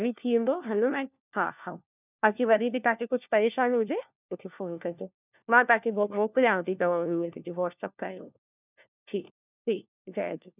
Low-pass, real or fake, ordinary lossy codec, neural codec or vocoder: 3.6 kHz; fake; none; codec, 16 kHz, 1 kbps, FunCodec, trained on LibriTTS, 50 frames a second